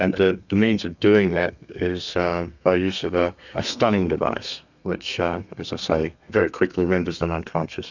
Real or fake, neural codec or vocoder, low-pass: fake; codec, 32 kHz, 1.9 kbps, SNAC; 7.2 kHz